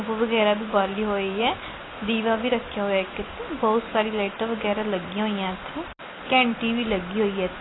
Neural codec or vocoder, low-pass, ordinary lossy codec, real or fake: none; 7.2 kHz; AAC, 16 kbps; real